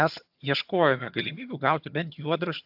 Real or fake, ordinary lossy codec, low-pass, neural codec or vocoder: fake; AAC, 48 kbps; 5.4 kHz; vocoder, 22.05 kHz, 80 mel bands, HiFi-GAN